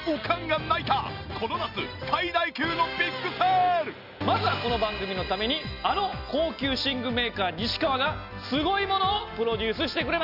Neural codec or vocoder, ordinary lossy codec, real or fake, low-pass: none; none; real; 5.4 kHz